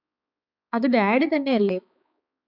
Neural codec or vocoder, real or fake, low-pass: codec, 16 kHz, 4 kbps, X-Codec, HuBERT features, trained on balanced general audio; fake; 5.4 kHz